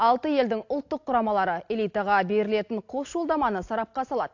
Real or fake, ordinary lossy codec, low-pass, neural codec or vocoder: real; AAC, 48 kbps; 7.2 kHz; none